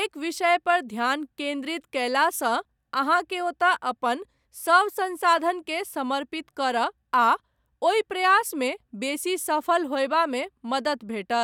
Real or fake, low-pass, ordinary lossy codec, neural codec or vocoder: real; 19.8 kHz; none; none